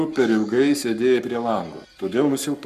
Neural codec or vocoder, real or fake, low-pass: codec, 44.1 kHz, 7.8 kbps, Pupu-Codec; fake; 14.4 kHz